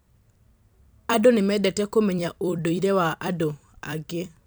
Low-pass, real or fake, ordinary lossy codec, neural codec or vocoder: none; fake; none; vocoder, 44.1 kHz, 128 mel bands every 256 samples, BigVGAN v2